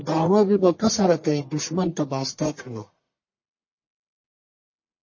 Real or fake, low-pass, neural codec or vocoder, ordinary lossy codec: fake; 7.2 kHz; codec, 44.1 kHz, 1.7 kbps, Pupu-Codec; MP3, 32 kbps